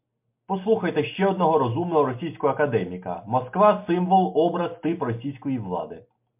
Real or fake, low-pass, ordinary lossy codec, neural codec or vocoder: real; 3.6 kHz; MP3, 32 kbps; none